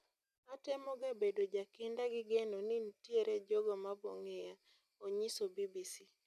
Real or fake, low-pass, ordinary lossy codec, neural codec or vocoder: real; 14.4 kHz; MP3, 96 kbps; none